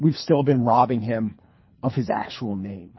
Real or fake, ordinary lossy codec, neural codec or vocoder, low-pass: fake; MP3, 24 kbps; codec, 24 kHz, 3 kbps, HILCodec; 7.2 kHz